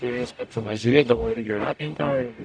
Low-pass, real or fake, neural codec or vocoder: 9.9 kHz; fake; codec, 44.1 kHz, 0.9 kbps, DAC